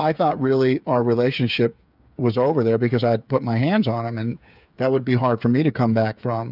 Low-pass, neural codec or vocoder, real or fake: 5.4 kHz; codec, 16 kHz, 8 kbps, FreqCodec, smaller model; fake